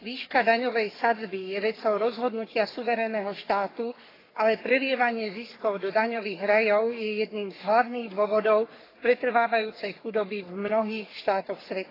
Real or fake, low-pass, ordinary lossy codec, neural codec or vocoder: fake; 5.4 kHz; AAC, 24 kbps; codec, 44.1 kHz, 3.4 kbps, Pupu-Codec